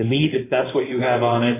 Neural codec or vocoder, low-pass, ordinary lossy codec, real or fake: codec, 16 kHz in and 24 kHz out, 2.2 kbps, FireRedTTS-2 codec; 3.6 kHz; AAC, 16 kbps; fake